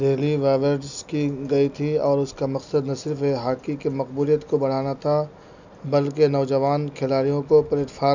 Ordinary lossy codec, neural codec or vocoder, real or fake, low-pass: none; none; real; 7.2 kHz